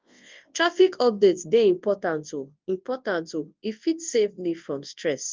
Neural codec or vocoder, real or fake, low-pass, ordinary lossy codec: codec, 24 kHz, 0.9 kbps, WavTokenizer, large speech release; fake; 7.2 kHz; Opus, 32 kbps